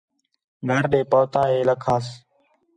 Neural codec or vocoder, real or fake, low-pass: none; real; 9.9 kHz